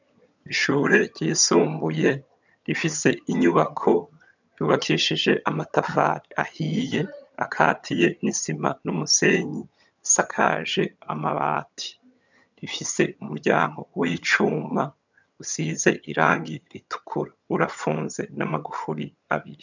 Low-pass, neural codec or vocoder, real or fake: 7.2 kHz; vocoder, 22.05 kHz, 80 mel bands, HiFi-GAN; fake